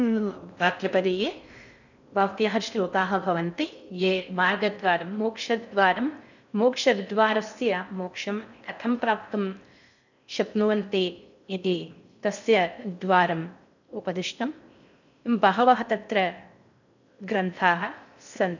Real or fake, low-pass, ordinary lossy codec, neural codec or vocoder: fake; 7.2 kHz; none; codec, 16 kHz in and 24 kHz out, 0.6 kbps, FocalCodec, streaming, 4096 codes